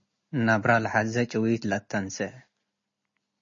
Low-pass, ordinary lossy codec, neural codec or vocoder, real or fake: 7.2 kHz; MP3, 32 kbps; none; real